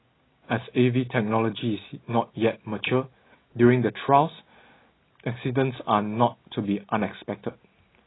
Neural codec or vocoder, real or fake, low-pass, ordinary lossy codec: none; real; 7.2 kHz; AAC, 16 kbps